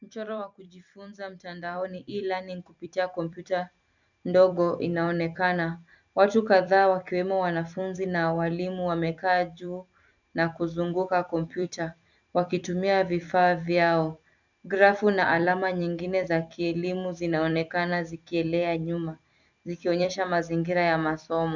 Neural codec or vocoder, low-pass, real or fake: vocoder, 44.1 kHz, 128 mel bands every 256 samples, BigVGAN v2; 7.2 kHz; fake